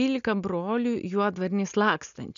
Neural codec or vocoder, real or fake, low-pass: none; real; 7.2 kHz